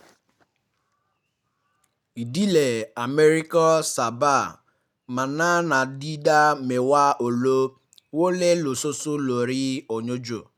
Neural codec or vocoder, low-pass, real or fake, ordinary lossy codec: none; 19.8 kHz; real; none